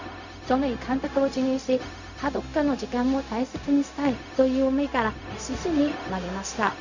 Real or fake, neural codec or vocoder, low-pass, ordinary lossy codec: fake; codec, 16 kHz, 0.4 kbps, LongCat-Audio-Codec; 7.2 kHz; none